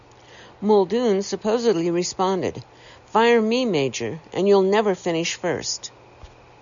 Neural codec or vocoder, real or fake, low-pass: none; real; 7.2 kHz